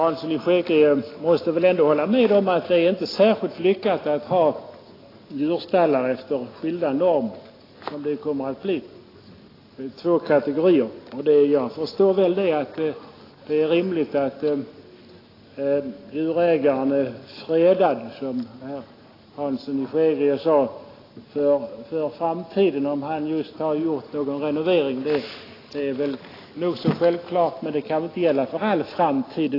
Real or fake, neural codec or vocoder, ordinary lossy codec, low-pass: real; none; AAC, 24 kbps; 5.4 kHz